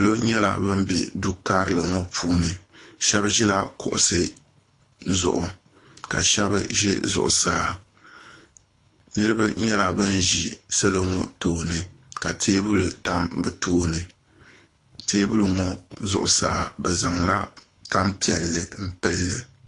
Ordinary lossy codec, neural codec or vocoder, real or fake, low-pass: AAC, 48 kbps; codec, 24 kHz, 3 kbps, HILCodec; fake; 10.8 kHz